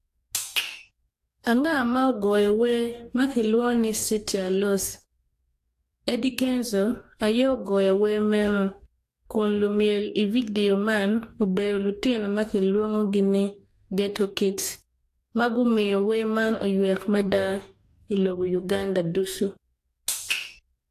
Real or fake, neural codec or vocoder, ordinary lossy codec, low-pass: fake; codec, 44.1 kHz, 2.6 kbps, DAC; AAC, 64 kbps; 14.4 kHz